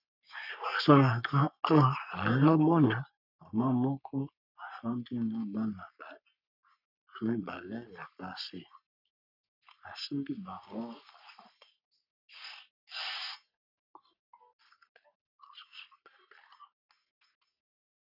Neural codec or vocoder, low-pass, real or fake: codec, 44.1 kHz, 3.4 kbps, Pupu-Codec; 5.4 kHz; fake